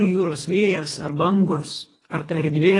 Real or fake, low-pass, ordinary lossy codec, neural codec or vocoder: fake; 10.8 kHz; AAC, 32 kbps; codec, 24 kHz, 1.5 kbps, HILCodec